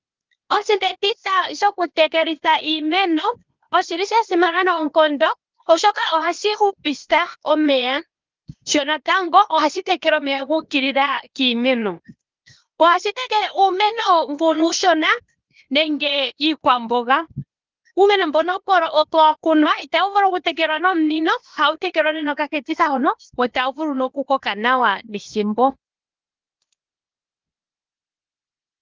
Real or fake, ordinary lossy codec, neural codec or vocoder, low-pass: fake; Opus, 32 kbps; codec, 16 kHz, 0.8 kbps, ZipCodec; 7.2 kHz